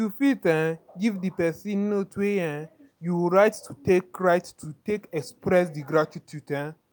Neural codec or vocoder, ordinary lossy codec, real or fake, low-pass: none; none; real; none